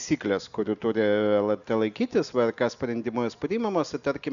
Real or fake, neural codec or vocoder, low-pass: real; none; 7.2 kHz